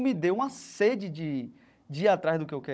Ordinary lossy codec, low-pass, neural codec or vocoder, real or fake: none; none; codec, 16 kHz, 16 kbps, FunCodec, trained on Chinese and English, 50 frames a second; fake